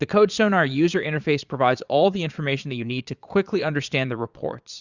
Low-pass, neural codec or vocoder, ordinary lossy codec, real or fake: 7.2 kHz; vocoder, 22.05 kHz, 80 mel bands, Vocos; Opus, 64 kbps; fake